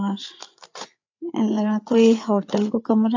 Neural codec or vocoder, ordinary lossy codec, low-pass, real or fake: codec, 16 kHz, 8 kbps, FreqCodec, larger model; AAC, 48 kbps; 7.2 kHz; fake